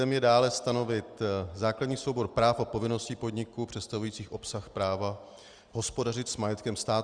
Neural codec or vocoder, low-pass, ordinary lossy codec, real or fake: none; 9.9 kHz; Opus, 32 kbps; real